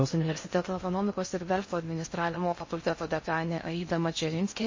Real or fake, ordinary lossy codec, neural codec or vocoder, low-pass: fake; MP3, 32 kbps; codec, 16 kHz in and 24 kHz out, 0.6 kbps, FocalCodec, streaming, 4096 codes; 7.2 kHz